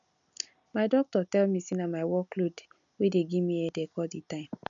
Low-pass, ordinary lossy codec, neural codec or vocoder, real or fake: 7.2 kHz; none; none; real